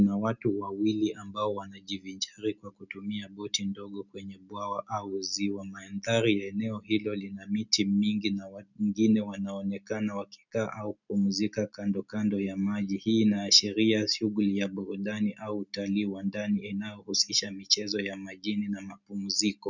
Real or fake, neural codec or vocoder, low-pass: real; none; 7.2 kHz